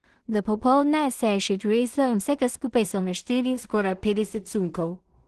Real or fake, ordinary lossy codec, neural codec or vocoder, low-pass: fake; Opus, 16 kbps; codec, 16 kHz in and 24 kHz out, 0.4 kbps, LongCat-Audio-Codec, two codebook decoder; 10.8 kHz